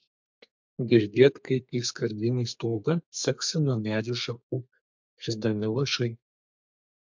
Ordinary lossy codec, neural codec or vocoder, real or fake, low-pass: MP3, 64 kbps; codec, 32 kHz, 1.9 kbps, SNAC; fake; 7.2 kHz